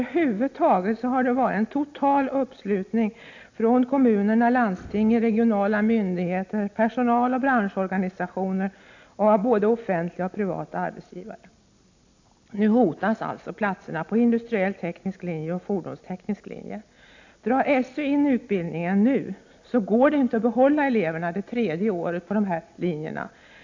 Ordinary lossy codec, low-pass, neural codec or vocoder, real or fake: AAC, 48 kbps; 7.2 kHz; none; real